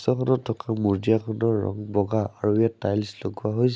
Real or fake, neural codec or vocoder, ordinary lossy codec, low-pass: real; none; none; none